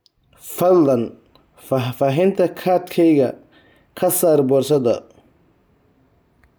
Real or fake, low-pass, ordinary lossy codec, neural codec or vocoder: real; none; none; none